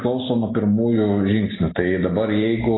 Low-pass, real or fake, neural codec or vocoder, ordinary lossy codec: 7.2 kHz; real; none; AAC, 16 kbps